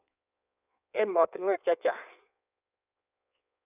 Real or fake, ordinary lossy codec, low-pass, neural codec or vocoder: fake; none; 3.6 kHz; codec, 16 kHz in and 24 kHz out, 1.1 kbps, FireRedTTS-2 codec